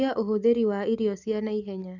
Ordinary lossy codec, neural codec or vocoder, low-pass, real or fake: none; none; 7.2 kHz; real